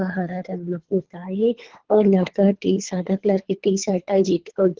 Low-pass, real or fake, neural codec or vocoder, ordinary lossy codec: 7.2 kHz; fake; codec, 24 kHz, 3 kbps, HILCodec; Opus, 16 kbps